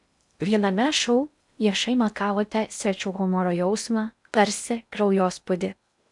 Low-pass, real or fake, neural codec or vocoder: 10.8 kHz; fake; codec, 16 kHz in and 24 kHz out, 0.6 kbps, FocalCodec, streaming, 2048 codes